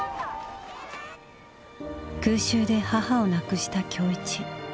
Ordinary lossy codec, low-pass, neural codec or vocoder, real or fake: none; none; none; real